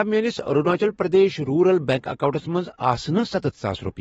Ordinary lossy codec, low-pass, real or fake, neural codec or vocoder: AAC, 24 kbps; 19.8 kHz; fake; autoencoder, 48 kHz, 128 numbers a frame, DAC-VAE, trained on Japanese speech